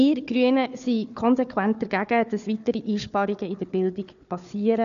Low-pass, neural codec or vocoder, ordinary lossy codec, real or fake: 7.2 kHz; codec, 16 kHz, 4 kbps, FunCodec, trained on Chinese and English, 50 frames a second; none; fake